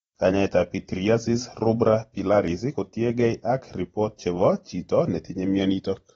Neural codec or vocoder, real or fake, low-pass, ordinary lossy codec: vocoder, 44.1 kHz, 128 mel bands every 512 samples, BigVGAN v2; fake; 19.8 kHz; AAC, 24 kbps